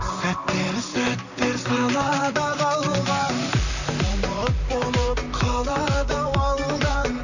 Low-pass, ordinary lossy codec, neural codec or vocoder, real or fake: 7.2 kHz; none; vocoder, 44.1 kHz, 128 mel bands, Pupu-Vocoder; fake